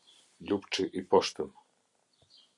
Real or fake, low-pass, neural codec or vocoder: real; 10.8 kHz; none